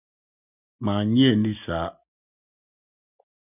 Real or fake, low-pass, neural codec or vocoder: real; 3.6 kHz; none